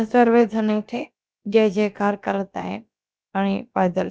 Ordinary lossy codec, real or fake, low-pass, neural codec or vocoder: none; fake; none; codec, 16 kHz, about 1 kbps, DyCAST, with the encoder's durations